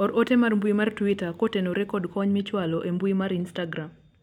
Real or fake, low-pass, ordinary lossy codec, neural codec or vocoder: real; 19.8 kHz; none; none